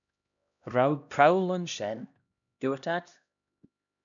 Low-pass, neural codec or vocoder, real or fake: 7.2 kHz; codec, 16 kHz, 1 kbps, X-Codec, HuBERT features, trained on LibriSpeech; fake